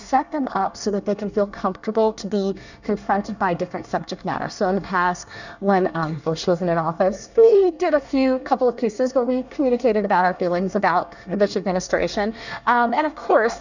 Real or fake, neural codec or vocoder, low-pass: fake; codec, 24 kHz, 1 kbps, SNAC; 7.2 kHz